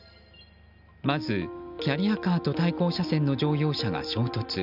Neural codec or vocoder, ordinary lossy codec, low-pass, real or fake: none; none; 5.4 kHz; real